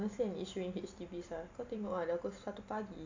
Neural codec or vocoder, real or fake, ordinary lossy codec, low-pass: none; real; AAC, 48 kbps; 7.2 kHz